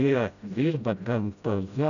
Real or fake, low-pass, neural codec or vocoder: fake; 7.2 kHz; codec, 16 kHz, 0.5 kbps, FreqCodec, smaller model